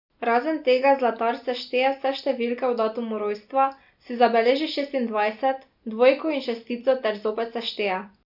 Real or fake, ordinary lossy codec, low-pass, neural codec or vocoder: real; none; 5.4 kHz; none